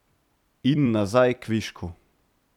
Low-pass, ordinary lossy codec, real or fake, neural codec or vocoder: 19.8 kHz; none; fake; vocoder, 44.1 kHz, 128 mel bands every 256 samples, BigVGAN v2